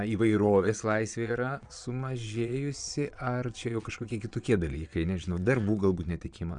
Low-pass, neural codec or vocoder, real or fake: 9.9 kHz; vocoder, 22.05 kHz, 80 mel bands, Vocos; fake